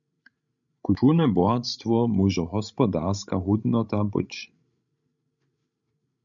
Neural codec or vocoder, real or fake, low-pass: codec, 16 kHz, 16 kbps, FreqCodec, larger model; fake; 7.2 kHz